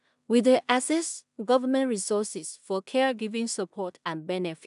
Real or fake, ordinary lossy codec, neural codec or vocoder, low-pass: fake; none; codec, 16 kHz in and 24 kHz out, 0.4 kbps, LongCat-Audio-Codec, two codebook decoder; 10.8 kHz